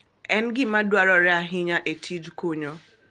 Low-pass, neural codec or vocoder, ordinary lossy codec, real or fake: 9.9 kHz; none; Opus, 24 kbps; real